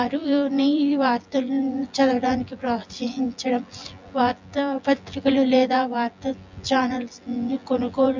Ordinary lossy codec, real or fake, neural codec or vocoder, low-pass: MP3, 64 kbps; fake; vocoder, 24 kHz, 100 mel bands, Vocos; 7.2 kHz